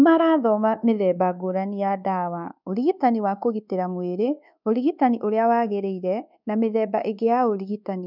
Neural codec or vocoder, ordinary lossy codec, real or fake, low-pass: codec, 24 kHz, 1.2 kbps, DualCodec; none; fake; 5.4 kHz